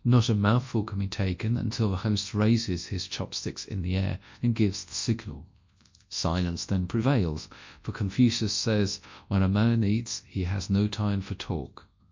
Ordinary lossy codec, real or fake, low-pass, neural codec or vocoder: MP3, 48 kbps; fake; 7.2 kHz; codec, 24 kHz, 0.9 kbps, WavTokenizer, large speech release